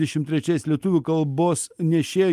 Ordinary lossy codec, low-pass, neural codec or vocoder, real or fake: Opus, 32 kbps; 14.4 kHz; none; real